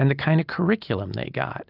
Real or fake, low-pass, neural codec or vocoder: real; 5.4 kHz; none